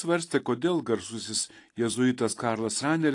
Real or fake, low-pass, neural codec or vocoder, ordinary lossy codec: real; 10.8 kHz; none; AAC, 48 kbps